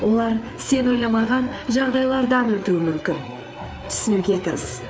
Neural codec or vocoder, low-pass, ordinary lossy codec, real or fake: codec, 16 kHz, 4 kbps, FreqCodec, larger model; none; none; fake